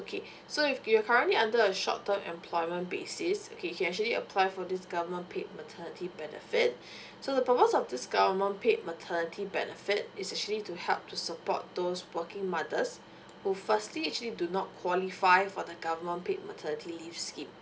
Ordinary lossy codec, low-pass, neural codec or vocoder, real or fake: none; none; none; real